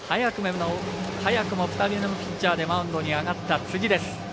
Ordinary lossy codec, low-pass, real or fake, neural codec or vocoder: none; none; real; none